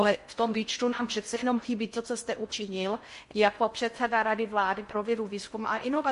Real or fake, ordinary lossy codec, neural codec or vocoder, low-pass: fake; MP3, 48 kbps; codec, 16 kHz in and 24 kHz out, 0.6 kbps, FocalCodec, streaming, 2048 codes; 10.8 kHz